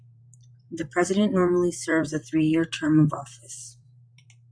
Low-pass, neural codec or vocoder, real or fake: 9.9 kHz; vocoder, 44.1 kHz, 128 mel bands, Pupu-Vocoder; fake